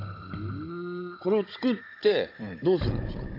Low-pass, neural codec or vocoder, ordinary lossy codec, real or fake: 5.4 kHz; codec, 16 kHz, 16 kbps, FunCodec, trained on Chinese and English, 50 frames a second; AAC, 32 kbps; fake